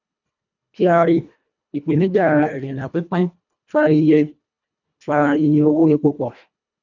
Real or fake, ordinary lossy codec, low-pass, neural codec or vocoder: fake; none; 7.2 kHz; codec, 24 kHz, 1.5 kbps, HILCodec